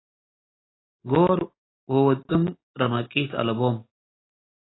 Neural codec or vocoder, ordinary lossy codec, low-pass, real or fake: none; AAC, 16 kbps; 7.2 kHz; real